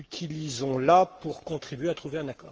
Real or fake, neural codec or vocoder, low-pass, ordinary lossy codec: fake; codec, 44.1 kHz, 7.8 kbps, Pupu-Codec; 7.2 kHz; Opus, 24 kbps